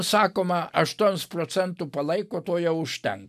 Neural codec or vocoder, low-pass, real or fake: none; 14.4 kHz; real